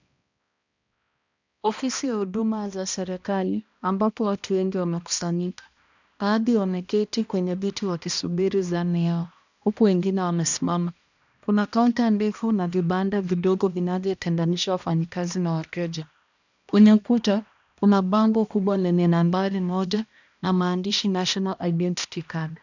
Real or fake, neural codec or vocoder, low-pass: fake; codec, 16 kHz, 1 kbps, X-Codec, HuBERT features, trained on balanced general audio; 7.2 kHz